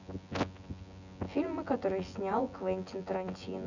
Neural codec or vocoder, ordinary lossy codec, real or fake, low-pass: vocoder, 24 kHz, 100 mel bands, Vocos; none; fake; 7.2 kHz